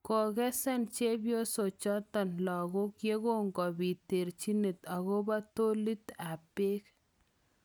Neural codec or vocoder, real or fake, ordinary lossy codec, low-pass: none; real; none; none